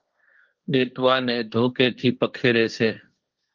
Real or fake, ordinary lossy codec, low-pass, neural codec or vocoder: fake; Opus, 24 kbps; 7.2 kHz; codec, 16 kHz, 1.1 kbps, Voila-Tokenizer